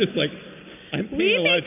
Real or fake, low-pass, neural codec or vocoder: real; 3.6 kHz; none